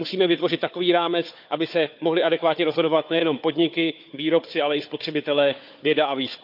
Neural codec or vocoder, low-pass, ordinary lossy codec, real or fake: codec, 16 kHz, 4 kbps, FunCodec, trained on Chinese and English, 50 frames a second; 5.4 kHz; none; fake